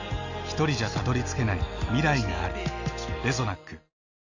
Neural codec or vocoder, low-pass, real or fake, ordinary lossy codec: none; 7.2 kHz; real; none